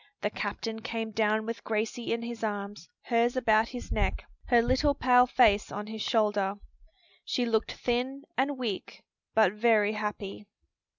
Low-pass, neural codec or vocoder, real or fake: 7.2 kHz; none; real